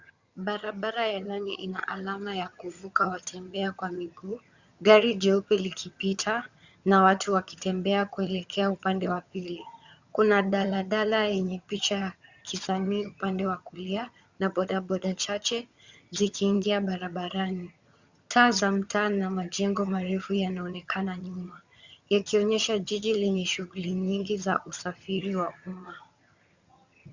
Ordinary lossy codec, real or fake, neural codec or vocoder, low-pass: Opus, 64 kbps; fake; vocoder, 22.05 kHz, 80 mel bands, HiFi-GAN; 7.2 kHz